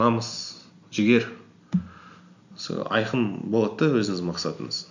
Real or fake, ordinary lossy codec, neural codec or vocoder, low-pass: real; none; none; 7.2 kHz